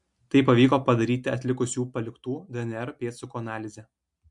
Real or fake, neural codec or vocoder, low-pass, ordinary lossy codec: real; none; 10.8 kHz; MP3, 64 kbps